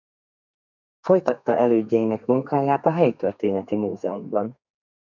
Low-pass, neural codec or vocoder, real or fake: 7.2 kHz; codec, 32 kHz, 1.9 kbps, SNAC; fake